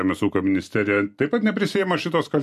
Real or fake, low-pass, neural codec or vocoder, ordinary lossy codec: real; 14.4 kHz; none; MP3, 64 kbps